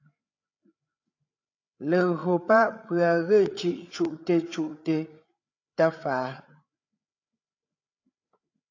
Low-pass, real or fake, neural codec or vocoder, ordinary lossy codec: 7.2 kHz; fake; codec, 16 kHz, 8 kbps, FreqCodec, larger model; AAC, 48 kbps